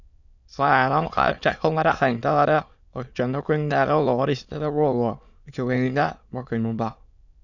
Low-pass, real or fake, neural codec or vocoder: 7.2 kHz; fake; autoencoder, 22.05 kHz, a latent of 192 numbers a frame, VITS, trained on many speakers